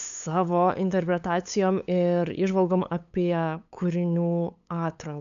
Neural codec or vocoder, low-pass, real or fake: codec, 16 kHz, 8 kbps, FunCodec, trained on LibriTTS, 25 frames a second; 7.2 kHz; fake